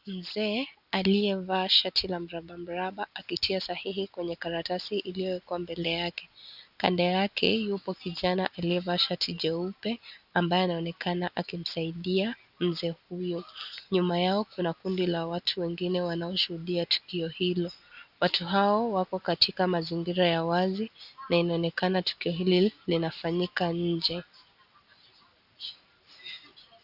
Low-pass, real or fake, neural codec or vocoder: 5.4 kHz; real; none